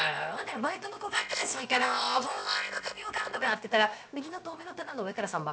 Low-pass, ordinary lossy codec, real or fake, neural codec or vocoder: none; none; fake; codec, 16 kHz, 0.7 kbps, FocalCodec